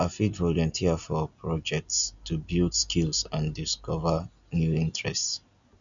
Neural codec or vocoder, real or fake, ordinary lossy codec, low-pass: none; real; none; 7.2 kHz